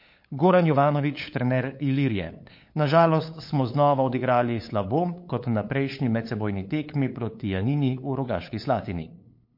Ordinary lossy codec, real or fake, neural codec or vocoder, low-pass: MP3, 32 kbps; fake; codec, 16 kHz, 8 kbps, FunCodec, trained on LibriTTS, 25 frames a second; 5.4 kHz